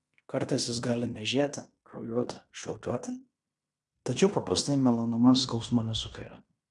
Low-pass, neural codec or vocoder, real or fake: 10.8 kHz; codec, 16 kHz in and 24 kHz out, 0.9 kbps, LongCat-Audio-Codec, fine tuned four codebook decoder; fake